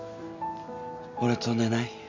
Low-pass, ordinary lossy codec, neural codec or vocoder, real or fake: 7.2 kHz; MP3, 64 kbps; none; real